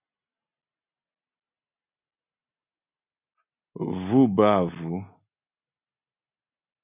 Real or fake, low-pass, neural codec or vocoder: real; 3.6 kHz; none